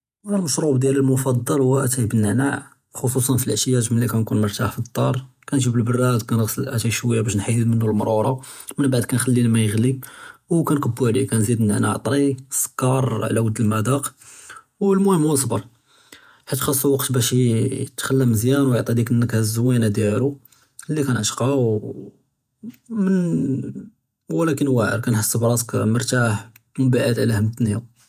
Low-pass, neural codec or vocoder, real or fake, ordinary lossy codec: 14.4 kHz; vocoder, 48 kHz, 128 mel bands, Vocos; fake; none